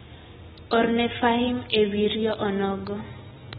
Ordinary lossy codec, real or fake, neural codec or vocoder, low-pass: AAC, 16 kbps; real; none; 19.8 kHz